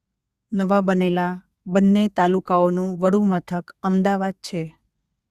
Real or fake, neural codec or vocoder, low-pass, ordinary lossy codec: fake; codec, 32 kHz, 1.9 kbps, SNAC; 14.4 kHz; Opus, 64 kbps